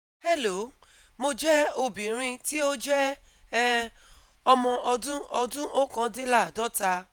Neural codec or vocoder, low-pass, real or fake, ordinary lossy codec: vocoder, 48 kHz, 128 mel bands, Vocos; none; fake; none